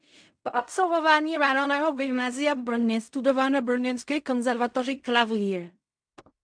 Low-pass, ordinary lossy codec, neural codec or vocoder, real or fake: 9.9 kHz; MP3, 64 kbps; codec, 16 kHz in and 24 kHz out, 0.4 kbps, LongCat-Audio-Codec, fine tuned four codebook decoder; fake